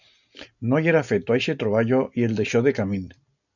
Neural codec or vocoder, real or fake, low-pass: none; real; 7.2 kHz